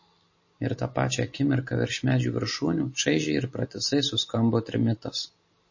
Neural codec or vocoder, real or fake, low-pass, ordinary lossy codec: none; real; 7.2 kHz; MP3, 32 kbps